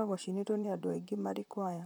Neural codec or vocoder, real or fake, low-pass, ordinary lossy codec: vocoder, 44.1 kHz, 128 mel bands, Pupu-Vocoder; fake; none; none